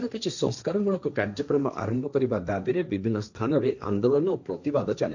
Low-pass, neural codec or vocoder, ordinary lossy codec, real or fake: 7.2 kHz; codec, 16 kHz, 1.1 kbps, Voila-Tokenizer; none; fake